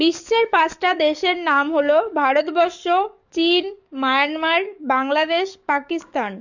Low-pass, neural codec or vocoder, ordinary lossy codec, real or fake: 7.2 kHz; codec, 44.1 kHz, 7.8 kbps, DAC; none; fake